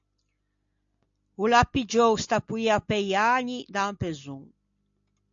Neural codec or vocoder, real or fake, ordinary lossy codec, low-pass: none; real; AAC, 64 kbps; 7.2 kHz